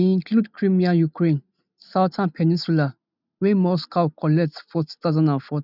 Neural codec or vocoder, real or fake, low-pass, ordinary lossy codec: none; real; 5.4 kHz; none